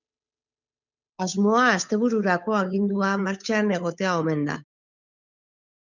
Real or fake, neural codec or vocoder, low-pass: fake; codec, 16 kHz, 8 kbps, FunCodec, trained on Chinese and English, 25 frames a second; 7.2 kHz